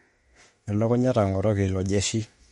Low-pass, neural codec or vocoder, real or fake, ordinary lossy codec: 19.8 kHz; autoencoder, 48 kHz, 32 numbers a frame, DAC-VAE, trained on Japanese speech; fake; MP3, 48 kbps